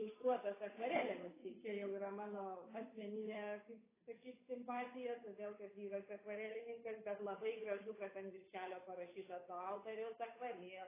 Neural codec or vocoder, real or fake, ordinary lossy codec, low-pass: codec, 16 kHz, 8 kbps, FunCodec, trained on Chinese and English, 25 frames a second; fake; AAC, 16 kbps; 3.6 kHz